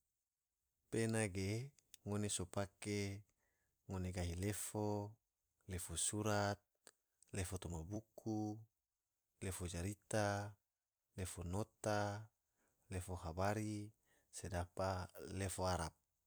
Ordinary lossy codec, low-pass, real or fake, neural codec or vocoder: none; none; fake; vocoder, 44.1 kHz, 128 mel bands every 256 samples, BigVGAN v2